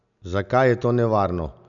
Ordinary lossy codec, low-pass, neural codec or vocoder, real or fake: none; 7.2 kHz; none; real